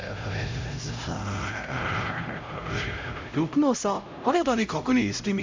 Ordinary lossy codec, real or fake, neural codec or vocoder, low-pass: MP3, 64 kbps; fake; codec, 16 kHz, 0.5 kbps, X-Codec, HuBERT features, trained on LibriSpeech; 7.2 kHz